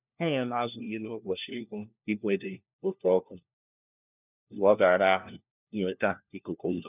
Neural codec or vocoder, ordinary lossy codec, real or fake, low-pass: codec, 16 kHz, 1 kbps, FunCodec, trained on LibriTTS, 50 frames a second; none; fake; 3.6 kHz